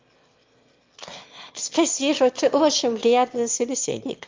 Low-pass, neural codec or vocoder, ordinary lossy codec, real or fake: 7.2 kHz; autoencoder, 22.05 kHz, a latent of 192 numbers a frame, VITS, trained on one speaker; Opus, 24 kbps; fake